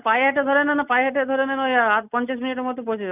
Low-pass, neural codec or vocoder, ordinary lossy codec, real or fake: 3.6 kHz; none; none; real